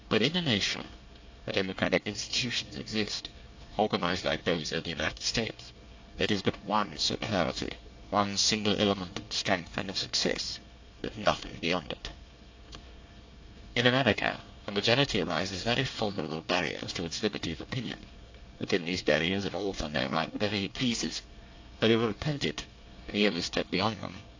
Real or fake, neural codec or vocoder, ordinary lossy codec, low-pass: fake; codec, 24 kHz, 1 kbps, SNAC; AAC, 48 kbps; 7.2 kHz